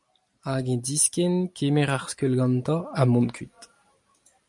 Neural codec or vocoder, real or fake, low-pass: none; real; 10.8 kHz